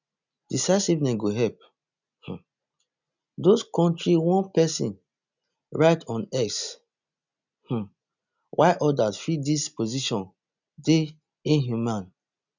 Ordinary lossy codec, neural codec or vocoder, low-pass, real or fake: none; none; 7.2 kHz; real